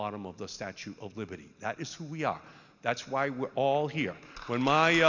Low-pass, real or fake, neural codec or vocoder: 7.2 kHz; real; none